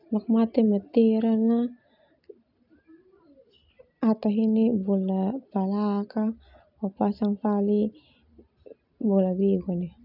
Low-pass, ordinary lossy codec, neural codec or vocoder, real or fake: 5.4 kHz; none; none; real